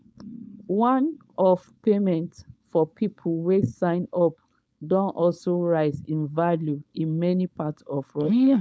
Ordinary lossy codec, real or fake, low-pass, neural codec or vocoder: none; fake; none; codec, 16 kHz, 4.8 kbps, FACodec